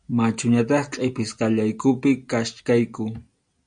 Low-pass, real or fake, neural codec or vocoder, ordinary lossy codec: 9.9 kHz; real; none; MP3, 96 kbps